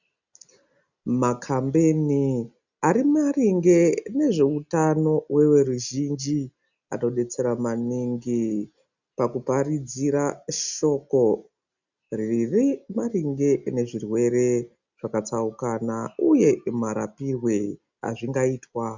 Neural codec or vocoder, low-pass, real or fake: none; 7.2 kHz; real